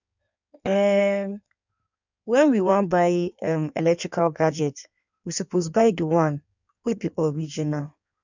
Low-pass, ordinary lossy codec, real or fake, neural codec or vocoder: 7.2 kHz; none; fake; codec, 16 kHz in and 24 kHz out, 1.1 kbps, FireRedTTS-2 codec